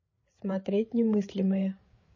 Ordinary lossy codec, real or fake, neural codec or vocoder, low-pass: MP3, 32 kbps; fake; codec, 16 kHz, 8 kbps, FreqCodec, larger model; 7.2 kHz